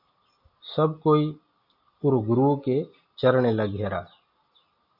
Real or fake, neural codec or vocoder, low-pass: real; none; 5.4 kHz